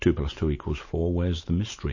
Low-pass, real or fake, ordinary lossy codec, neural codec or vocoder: 7.2 kHz; real; MP3, 32 kbps; none